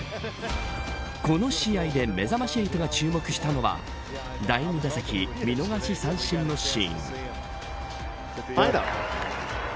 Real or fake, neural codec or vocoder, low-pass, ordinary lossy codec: real; none; none; none